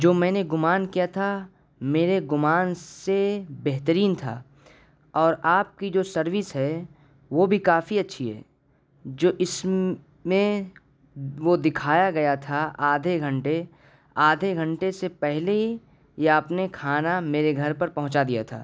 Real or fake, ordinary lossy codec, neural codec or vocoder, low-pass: real; none; none; none